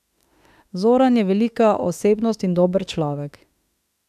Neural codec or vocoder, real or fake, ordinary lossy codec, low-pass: autoencoder, 48 kHz, 32 numbers a frame, DAC-VAE, trained on Japanese speech; fake; none; 14.4 kHz